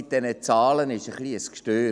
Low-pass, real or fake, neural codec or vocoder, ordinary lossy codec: 9.9 kHz; real; none; none